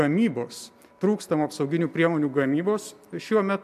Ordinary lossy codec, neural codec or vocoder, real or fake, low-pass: MP3, 96 kbps; none; real; 14.4 kHz